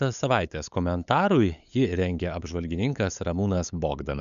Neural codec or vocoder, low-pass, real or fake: codec, 16 kHz, 8 kbps, FunCodec, trained on LibriTTS, 25 frames a second; 7.2 kHz; fake